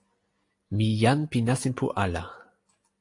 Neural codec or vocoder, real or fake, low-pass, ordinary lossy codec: none; real; 10.8 kHz; AAC, 48 kbps